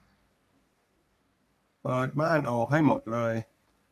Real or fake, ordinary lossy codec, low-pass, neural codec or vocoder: fake; none; 14.4 kHz; codec, 44.1 kHz, 3.4 kbps, Pupu-Codec